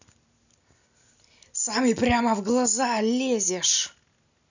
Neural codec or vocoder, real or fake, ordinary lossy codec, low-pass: none; real; none; 7.2 kHz